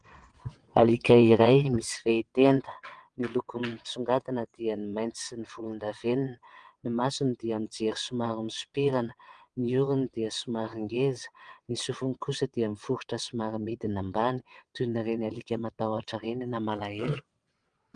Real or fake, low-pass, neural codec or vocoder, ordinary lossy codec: fake; 9.9 kHz; vocoder, 22.05 kHz, 80 mel bands, WaveNeXt; Opus, 24 kbps